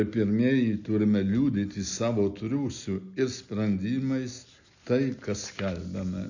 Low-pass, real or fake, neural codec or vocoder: 7.2 kHz; real; none